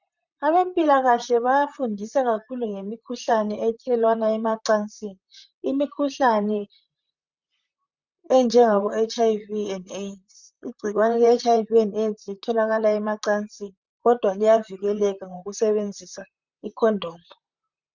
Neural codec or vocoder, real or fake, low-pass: vocoder, 44.1 kHz, 128 mel bands, Pupu-Vocoder; fake; 7.2 kHz